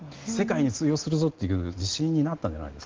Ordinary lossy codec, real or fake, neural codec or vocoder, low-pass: Opus, 16 kbps; real; none; 7.2 kHz